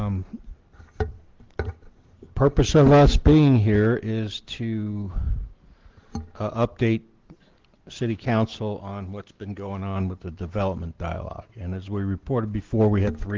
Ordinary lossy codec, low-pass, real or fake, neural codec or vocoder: Opus, 16 kbps; 7.2 kHz; real; none